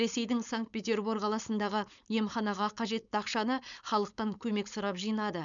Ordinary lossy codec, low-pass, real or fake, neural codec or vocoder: MP3, 96 kbps; 7.2 kHz; fake; codec, 16 kHz, 4.8 kbps, FACodec